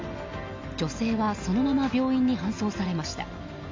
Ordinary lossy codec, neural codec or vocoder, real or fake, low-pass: MP3, 64 kbps; none; real; 7.2 kHz